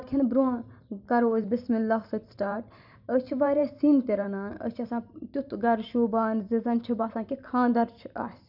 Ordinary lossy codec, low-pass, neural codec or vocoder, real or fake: none; 5.4 kHz; vocoder, 44.1 kHz, 128 mel bands every 512 samples, BigVGAN v2; fake